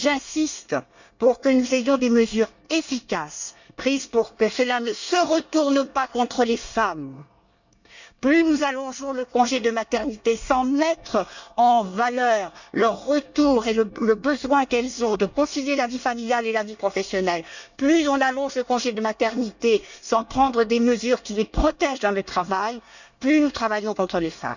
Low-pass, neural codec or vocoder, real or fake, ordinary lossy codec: 7.2 kHz; codec, 24 kHz, 1 kbps, SNAC; fake; none